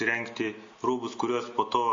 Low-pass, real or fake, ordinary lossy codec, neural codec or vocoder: 7.2 kHz; real; MP3, 32 kbps; none